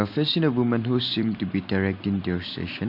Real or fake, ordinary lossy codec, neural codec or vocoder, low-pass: real; MP3, 48 kbps; none; 5.4 kHz